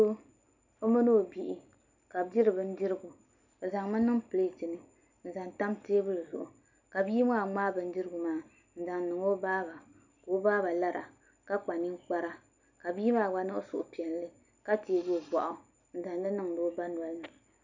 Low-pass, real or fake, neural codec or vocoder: 7.2 kHz; real; none